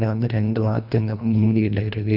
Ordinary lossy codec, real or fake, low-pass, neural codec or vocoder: none; fake; 5.4 kHz; codec, 24 kHz, 1.5 kbps, HILCodec